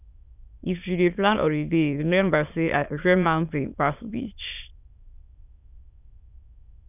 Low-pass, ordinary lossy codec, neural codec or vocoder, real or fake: 3.6 kHz; none; autoencoder, 22.05 kHz, a latent of 192 numbers a frame, VITS, trained on many speakers; fake